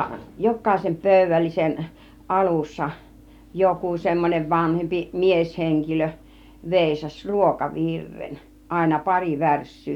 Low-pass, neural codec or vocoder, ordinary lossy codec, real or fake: 19.8 kHz; none; none; real